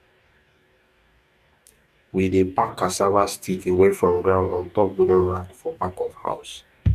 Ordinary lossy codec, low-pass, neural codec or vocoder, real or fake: none; 14.4 kHz; codec, 44.1 kHz, 2.6 kbps, DAC; fake